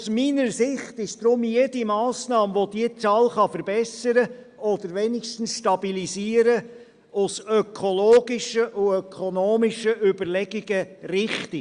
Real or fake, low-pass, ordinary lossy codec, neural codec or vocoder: real; 9.9 kHz; Opus, 64 kbps; none